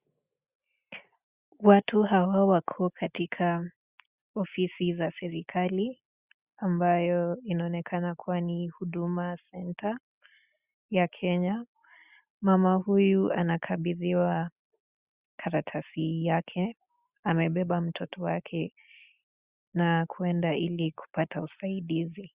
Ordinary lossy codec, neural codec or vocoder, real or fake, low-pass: Opus, 64 kbps; none; real; 3.6 kHz